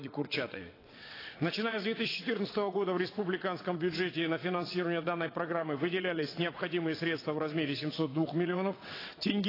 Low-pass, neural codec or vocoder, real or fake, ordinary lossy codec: 5.4 kHz; vocoder, 22.05 kHz, 80 mel bands, WaveNeXt; fake; AAC, 24 kbps